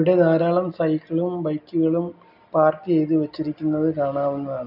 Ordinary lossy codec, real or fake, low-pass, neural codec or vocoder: none; real; 5.4 kHz; none